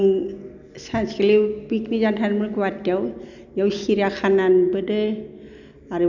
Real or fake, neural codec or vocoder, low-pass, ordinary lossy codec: real; none; 7.2 kHz; none